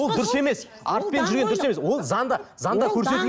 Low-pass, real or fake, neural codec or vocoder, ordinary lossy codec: none; real; none; none